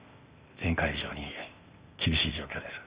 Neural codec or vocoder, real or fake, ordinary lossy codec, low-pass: codec, 16 kHz, 0.8 kbps, ZipCodec; fake; Opus, 64 kbps; 3.6 kHz